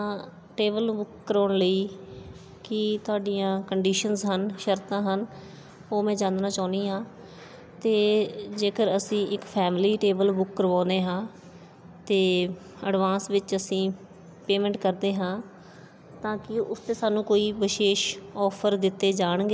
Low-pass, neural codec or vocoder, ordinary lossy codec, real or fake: none; none; none; real